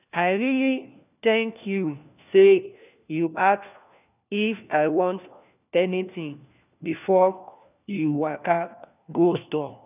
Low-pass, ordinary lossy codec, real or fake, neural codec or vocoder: 3.6 kHz; none; fake; codec, 16 kHz, 1 kbps, FunCodec, trained on LibriTTS, 50 frames a second